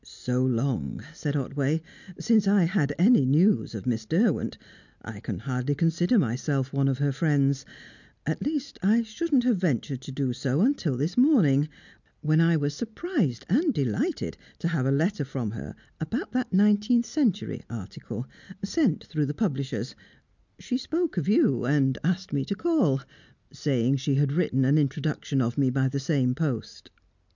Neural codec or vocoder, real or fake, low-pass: none; real; 7.2 kHz